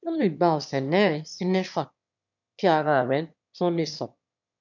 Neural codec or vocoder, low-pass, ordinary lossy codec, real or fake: autoencoder, 22.05 kHz, a latent of 192 numbers a frame, VITS, trained on one speaker; 7.2 kHz; none; fake